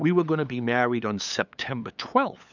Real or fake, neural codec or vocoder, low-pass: fake; codec, 16 kHz, 8 kbps, FunCodec, trained on LibriTTS, 25 frames a second; 7.2 kHz